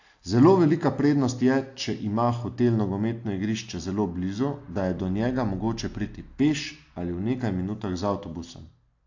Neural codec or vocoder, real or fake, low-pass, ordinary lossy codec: none; real; 7.2 kHz; AAC, 48 kbps